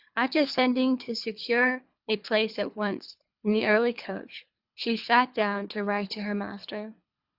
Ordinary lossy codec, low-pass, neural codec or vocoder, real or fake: Opus, 64 kbps; 5.4 kHz; codec, 24 kHz, 3 kbps, HILCodec; fake